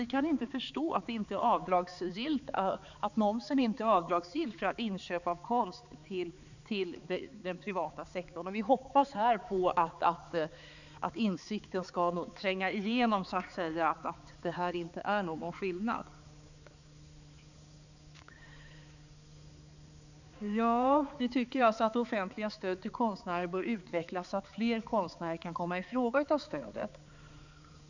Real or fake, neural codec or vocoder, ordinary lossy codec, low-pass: fake; codec, 16 kHz, 4 kbps, X-Codec, HuBERT features, trained on balanced general audio; none; 7.2 kHz